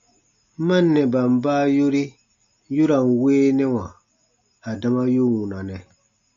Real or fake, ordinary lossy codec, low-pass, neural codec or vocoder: real; MP3, 64 kbps; 7.2 kHz; none